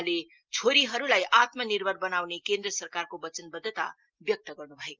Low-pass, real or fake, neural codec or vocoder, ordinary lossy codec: 7.2 kHz; real; none; Opus, 32 kbps